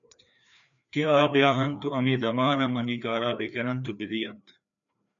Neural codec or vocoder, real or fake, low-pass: codec, 16 kHz, 2 kbps, FreqCodec, larger model; fake; 7.2 kHz